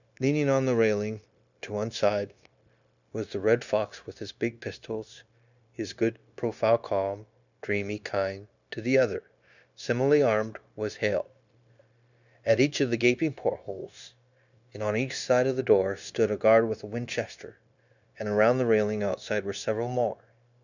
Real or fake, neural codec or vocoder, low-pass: fake; codec, 16 kHz, 0.9 kbps, LongCat-Audio-Codec; 7.2 kHz